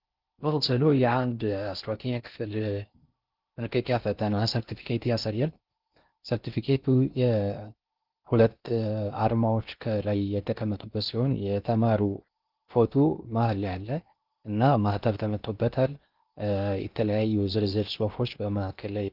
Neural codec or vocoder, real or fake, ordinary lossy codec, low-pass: codec, 16 kHz in and 24 kHz out, 0.6 kbps, FocalCodec, streaming, 4096 codes; fake; Opus, 32 kbps; 5.4 kHz